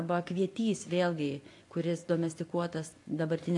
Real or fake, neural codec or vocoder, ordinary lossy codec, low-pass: fake; vocoder, 24 kHz, 100 mel bands, Vocos; AAC, 48 kbps; 10.8 kHz